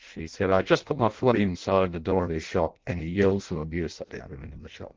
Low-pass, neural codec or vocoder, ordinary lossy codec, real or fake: 7.2 kHz; codec, 16 kHz in and 24 kHz out, 0.6 kbps, FireRedTTS-2 codec; Opus, 16 kbps; fake